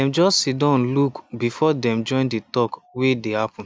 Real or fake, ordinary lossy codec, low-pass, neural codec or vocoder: real; none; none; none